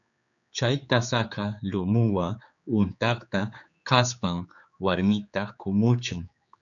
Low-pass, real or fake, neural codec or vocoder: 7.2 kHz; fake; codec, 16 kHz, 4 kbps, X-Codec, HuBERT features, trained on general audio